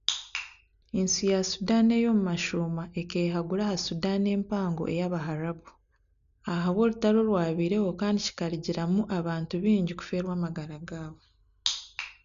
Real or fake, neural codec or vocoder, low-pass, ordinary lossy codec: real; none; 7.2 kHz; none